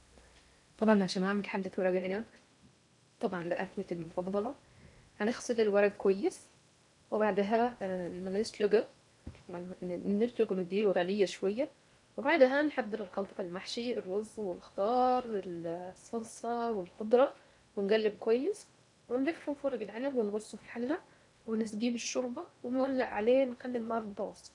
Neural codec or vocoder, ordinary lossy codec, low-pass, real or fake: codec, 16 kHz in and 24 kHz out, 0.8 kbps, FocalCodec, streaming, 65536 codes; none; 10.8 kHz; fake